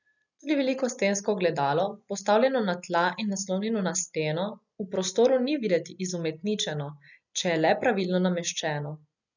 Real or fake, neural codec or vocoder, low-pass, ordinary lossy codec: real; none; 7.2 kHz; none